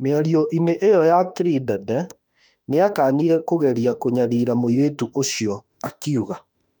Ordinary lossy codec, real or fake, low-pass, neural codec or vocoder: none; fake; 19.8 kHz; autoencoder, 48 kHz, 32 numbers a frame, DAC-VAE, trained on Japanese speech